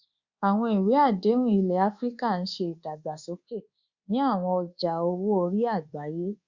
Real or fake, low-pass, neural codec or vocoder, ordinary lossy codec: fake; 7.2 kHz; codec, 24 kHz, 1.2 kbps, DualCodec; Opus, 64 kbps